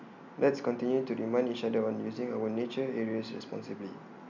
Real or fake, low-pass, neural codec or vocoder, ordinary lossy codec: real; 7.2 kHz; none; none